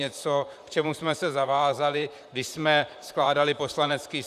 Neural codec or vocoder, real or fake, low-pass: vocoder, 44.1 kHz, 128 mel bands, Pupu-Vocoder; fake; 14.4 kHz